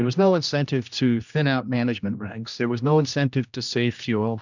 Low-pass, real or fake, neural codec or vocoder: 7.2 kHz; fake; codec, 16 kHz, 1 kbps, X-Codec, HuBERT features, trained on general audio